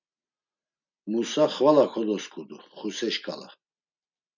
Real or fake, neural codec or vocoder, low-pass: real; none; 7.2 kHz